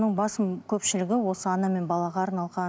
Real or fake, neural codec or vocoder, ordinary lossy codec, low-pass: real; none; none; none